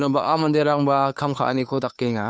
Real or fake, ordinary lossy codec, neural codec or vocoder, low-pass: fake; none; codec, 16 kHz, 2 kbps, FunCodec, trained on Chinese and English, 25 frames a second; none